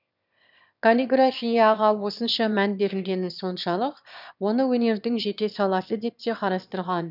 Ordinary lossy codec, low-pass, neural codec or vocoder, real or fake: none; 5.4 kHz; autoencoder, 22.05 kHz, a latent of 192 numbers a frame, VITS, trained on one speaker; fake